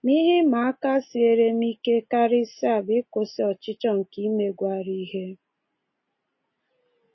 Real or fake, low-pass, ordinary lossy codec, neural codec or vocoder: real; 7.2 kHz; MP3, 24 kbps; none